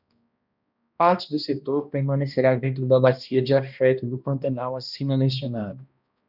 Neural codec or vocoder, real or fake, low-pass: codec, 16 kHz, 1 kbps, X-Codec, HuBERT features, trained on balanced general audio; fake; 5.4 kHz